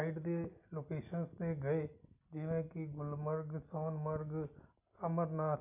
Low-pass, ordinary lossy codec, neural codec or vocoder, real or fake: 3.6 kHz; none; none; real